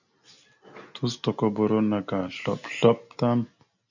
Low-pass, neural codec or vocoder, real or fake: 7.2 kHz; none; real